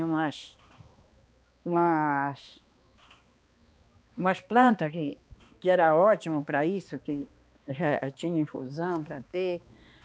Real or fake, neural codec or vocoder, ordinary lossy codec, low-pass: fake; codec, 16 kHz, 2 kbps, X-Codec, HuBERT features, trained on balanced general audio; none; none